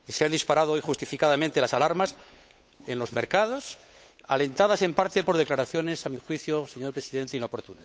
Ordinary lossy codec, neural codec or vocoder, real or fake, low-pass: none; codec, 16 kHz, 8 kbps, FunCodec, trained on Chinese and English, 25 frames a second; fake; none